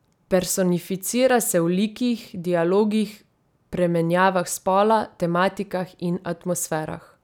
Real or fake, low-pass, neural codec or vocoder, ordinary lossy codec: real; 19.8 kHz; none; none